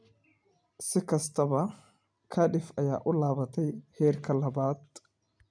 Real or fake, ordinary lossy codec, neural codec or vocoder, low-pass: real; none; none; 9.9 kHz